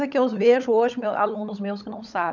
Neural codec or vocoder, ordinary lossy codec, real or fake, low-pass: codec, 16 kHz, 16 kbps, FunCodec, trained on LibriTTS, 50 frames a second; none; fake; 7.2 kHz